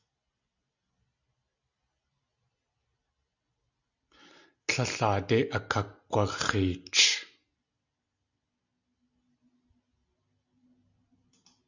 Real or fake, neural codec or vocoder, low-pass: real; none; 7.2 kHz